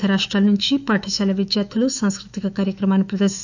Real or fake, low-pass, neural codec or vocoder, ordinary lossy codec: fake; 7.2 kHz; autoencoder, 48 kHz, 128 numbers a frame, DAC-VAE, trained on Japanese speech; none